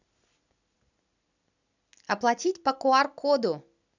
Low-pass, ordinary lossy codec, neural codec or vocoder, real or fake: 7.2 kHz; none; none; real